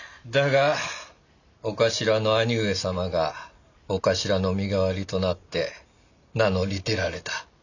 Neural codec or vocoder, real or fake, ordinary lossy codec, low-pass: none; real; none; 7.2 kHz